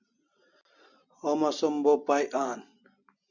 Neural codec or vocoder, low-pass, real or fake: none; 7.2 kHz; real